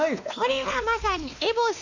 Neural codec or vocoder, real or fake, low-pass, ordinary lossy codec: codec, 16 kHz, 2 kbps, X-Codec, WavLM features, trained on Multilingual LibriSpeech; fake; 7.2 kHz; none